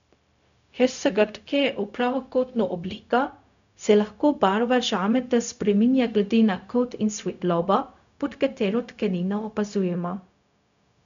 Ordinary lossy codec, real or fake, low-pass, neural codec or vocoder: none; fake; 7.2 kHz; codec, 16 kHz, 0.4 kbps, LongCat-Audio-Codec